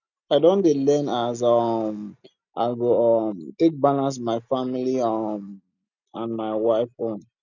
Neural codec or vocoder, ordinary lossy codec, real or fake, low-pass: none; none; real; 7.2 kHz